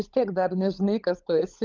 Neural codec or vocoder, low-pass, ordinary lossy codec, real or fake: codec, 16 kHz, 16 kbps, FunCodec, trained on LibriTTS, 50 frames a second; 7.2 kHz; Opus, 24 kbps; fake